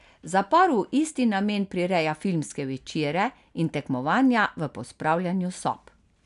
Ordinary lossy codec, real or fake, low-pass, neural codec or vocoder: none; real; 10.8 kHz; none